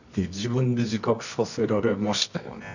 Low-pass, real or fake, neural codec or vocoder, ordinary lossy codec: 7.2 kHz; fake; codec, 24 kHz, 0.9 kbps, WavTokenizer, medium music audio release; none